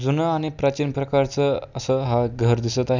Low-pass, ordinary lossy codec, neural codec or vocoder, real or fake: 7.2 kHz; none; none; real